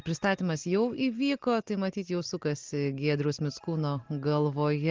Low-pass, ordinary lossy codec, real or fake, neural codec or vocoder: 7.2 kHz; Opus, 16 kbps; real; none